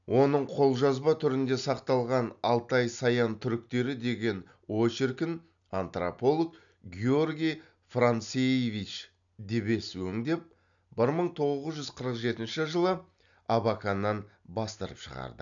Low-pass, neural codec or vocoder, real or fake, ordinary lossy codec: 7.2 kHz; none; real; none